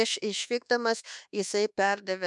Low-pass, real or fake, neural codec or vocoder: 10.8 kHz; fake; codec, 24 kHz, 1.2 kbps, DualCodec